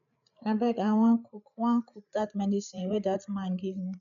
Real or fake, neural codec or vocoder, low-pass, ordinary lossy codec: fake; codec, 16 kHz, 8 kbps, FreqCodec, larger model; 7.2 kHz; none